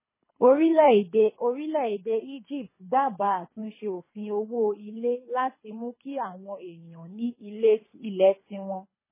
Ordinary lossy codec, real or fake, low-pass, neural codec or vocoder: MP3, 16 kbps; fake; 3.6 kHz; codec, 24 kHz, 3 kbps, HILCodec